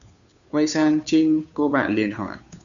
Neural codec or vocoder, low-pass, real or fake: codec, 16 kHz, 2 kbps, FunCodec, trained on Chinese and English, 25 frames a second; 7.2 kHz; fake